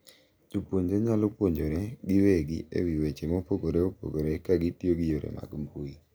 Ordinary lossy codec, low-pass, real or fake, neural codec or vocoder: none; none; real; none